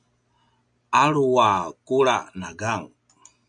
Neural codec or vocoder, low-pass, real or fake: none; 9.9 kHz; real